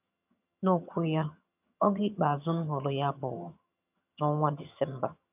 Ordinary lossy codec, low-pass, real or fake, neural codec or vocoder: none; 3.6 kHz; fake; vocoder, 22.05 kHz, 80 mel bands, HiFi-GAN